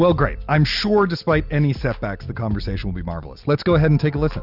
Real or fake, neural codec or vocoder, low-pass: real; none; 5.4 kHz